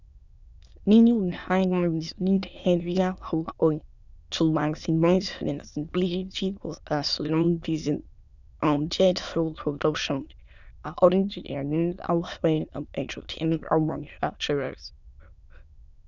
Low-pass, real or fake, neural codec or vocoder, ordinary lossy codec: 7.2 kHz; fake; autoencoder, 22.05 kHz, a latent of 192 numbers a frame, VITS, trained on many speakers; none